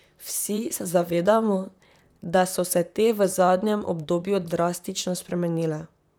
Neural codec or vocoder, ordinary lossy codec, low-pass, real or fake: vocoder, 44.1 kHz, 128 mel bands, Pupu-Vocoder; none; none; fake